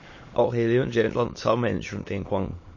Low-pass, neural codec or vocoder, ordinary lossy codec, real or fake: 7.2 kHz; autoencoder, 22.05 kHz, a latent of 192 numbers a frame, VITS, trained on many speakers; MP3, 32 kbps; fake